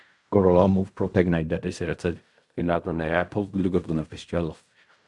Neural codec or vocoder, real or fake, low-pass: codec, 16 kHz in and 24 kHz out, 0.4 kbps, LongCat-Audio-Codec, fine tuned four codebook decoder; fake; 10.8 kHz